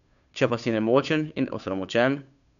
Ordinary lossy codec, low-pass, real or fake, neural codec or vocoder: none; 7.2 kHz; fake; codec, 16 kHz, 2 kbps, FunCodec, trained on Chinese and English, 25 frames a second